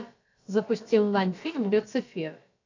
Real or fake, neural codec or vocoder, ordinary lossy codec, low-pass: fake; codec, 16 kHz, about 1 kbps, DyCAST, with the encoder's durations; AAC, 48 kbps; 7.2 kHz